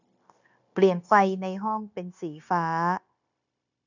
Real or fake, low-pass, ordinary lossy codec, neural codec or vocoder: fake; 7.2 kHz; none; codec, 16 kHz, 0.9 kbps, LongCat-Audio-Codec